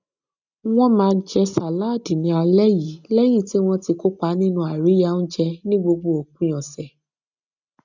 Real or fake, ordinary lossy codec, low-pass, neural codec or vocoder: real; none; 7.2 kHz; none